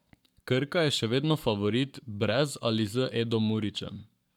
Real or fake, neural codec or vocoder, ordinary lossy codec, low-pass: fake; codec, 44.1 kHz, 7.8 kbps, Pupu-Codec; none; 19.8 kHz